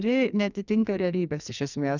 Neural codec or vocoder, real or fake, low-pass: codec, 44.1 kHz, 2.6 kbps, SNAC; fake; 7.2 kHz